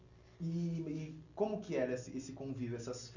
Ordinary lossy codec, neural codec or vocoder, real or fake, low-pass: none; none; real; 7.2 kHz